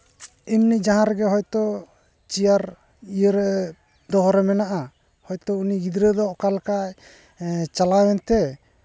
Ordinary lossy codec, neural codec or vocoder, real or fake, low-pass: none; none; real; none